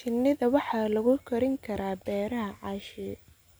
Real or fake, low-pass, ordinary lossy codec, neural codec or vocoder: real; none; none; none